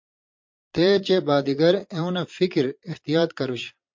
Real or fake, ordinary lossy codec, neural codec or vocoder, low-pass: fake; MP3, 48 kbps; vocoder, 22.05 kHz, 80 mel bands, Vocos; 7.2 kHz